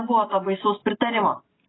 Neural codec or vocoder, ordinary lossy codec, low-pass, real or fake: none; AAC, 16 kbps; 7.2 kHz; real